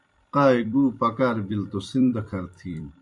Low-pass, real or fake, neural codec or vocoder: 10.8 kHz; fake; vocoder, 44.1 kHz, 128 mel bands every 256 samples, BigVGAN v2